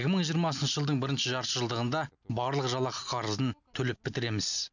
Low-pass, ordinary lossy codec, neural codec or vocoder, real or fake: 7.2 kHz; none; none; real